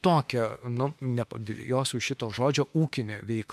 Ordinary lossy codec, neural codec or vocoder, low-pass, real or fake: MP3, 96 kbps; autoencoder, 48 kHz, 32 numbers a frame, DAC-VAE, trained on Japanese speech; 14.4 kHz; fake